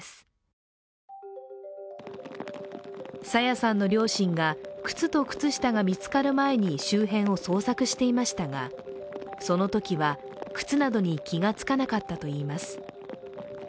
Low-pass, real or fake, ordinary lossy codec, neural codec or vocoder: none; real; none; none